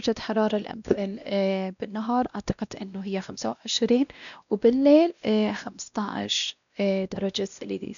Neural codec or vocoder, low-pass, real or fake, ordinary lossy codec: codec, 16 kHz, 1 kbps, X-Codec, WavLM features, trained on Multilingual LibriSpeech; 7.2 kHz; fake; none